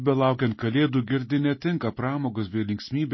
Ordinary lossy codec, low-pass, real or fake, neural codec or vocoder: MP3, 24 kbps; 7.2 kHz; real; none